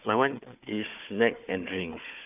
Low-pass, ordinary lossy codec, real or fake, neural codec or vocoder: 3.6 kHz; none; fake; codec, 16 kHz, 4 kbps, FunCodec, trained on Chinese and English, 50 frames a second